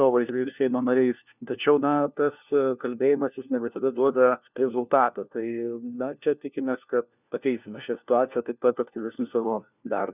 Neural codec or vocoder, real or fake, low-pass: codec, 16 kHz, 1 kbps, FunCodec, trained on LibriTTS, 50 frames a second; fake; 3.6 kHz